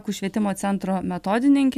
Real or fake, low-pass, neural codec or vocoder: real; 14.4 kHz; none